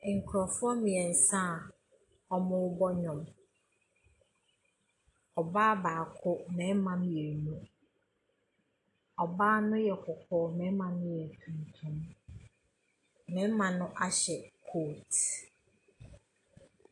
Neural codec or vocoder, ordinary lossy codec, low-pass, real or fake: none; AAC, 48 kbps; 10.8 kHz; real